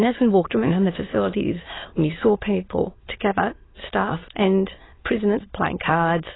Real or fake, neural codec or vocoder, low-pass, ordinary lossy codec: fake; autoencoder, 22.05 kHz, a latent of 192 numbers a frame, VITS, trained on many speakers; 7.2 kHz; AAC, 16 kbps